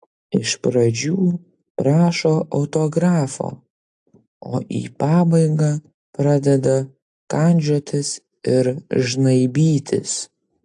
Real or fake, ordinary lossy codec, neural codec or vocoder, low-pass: real; AAC, 64 kbps; none; 10.8 kHz